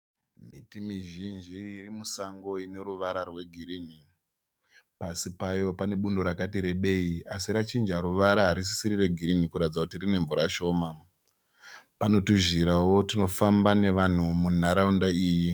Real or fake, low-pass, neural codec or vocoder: fake; 19.8 kHz; codec, 44.1 kHz, 7.8 kbps, DAC